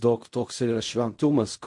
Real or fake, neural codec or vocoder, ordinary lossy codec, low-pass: fake; codec, 16 kHz in and 24 kHz out, 0.4 kbps, LongCat-Audio-Codec, fine tuned four codebook decoder; MP3, 48 kbps; 10.8 kHz